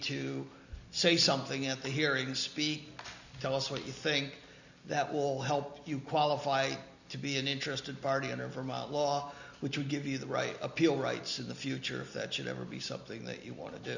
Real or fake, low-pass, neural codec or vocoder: real; 7.2 kHz; none